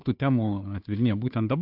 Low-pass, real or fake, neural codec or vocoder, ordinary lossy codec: 5.4 kHz; fake; codec, 16 kHz, 2 kbps, FunCodec, trained on Chinese and English, 25 frames a second; AAC, 48 kbps